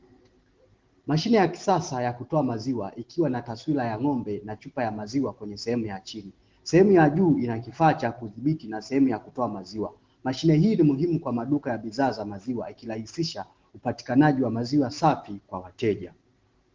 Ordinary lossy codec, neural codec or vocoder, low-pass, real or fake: Opus, 16 kbps; none; 7.2 kHz; real